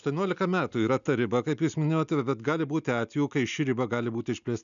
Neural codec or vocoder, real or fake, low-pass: none; real; 7.2 kHz